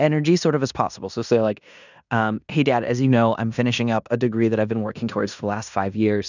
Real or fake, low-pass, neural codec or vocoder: fake; 7.2 kHz; codec, 16 kHz in and 24 kHz out, 0.9 kbps, LongCat-Audio-Codec, fine tuned four codebook decoder